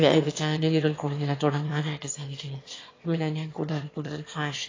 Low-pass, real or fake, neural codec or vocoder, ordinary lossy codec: 7.2 kHz; fake; autoencoder, 22.05 kHz, a latent of 192 numbers a frame, VITS, trained on one speaker; AAC, 32 kbps